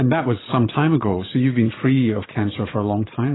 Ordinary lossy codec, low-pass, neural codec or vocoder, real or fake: AAC, 16 kbps; 7.2 kHz; vocoder, 22.05 kHz, 80 mel bands, Vocos; fake